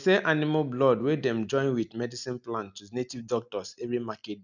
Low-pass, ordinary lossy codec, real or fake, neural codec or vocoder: 7.2 kHz; none; real; none